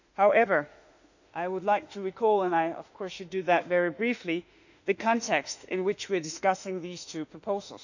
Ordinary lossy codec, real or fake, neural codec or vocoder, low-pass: none; fake; autoencoder, 48 kHz, 32 numbers a frame, DAC-VAE, trained on Japanese speech; 7.2 kHz